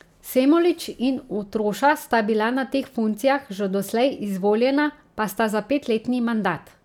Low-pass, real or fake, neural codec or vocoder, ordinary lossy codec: 19.8 kHz; real; none; none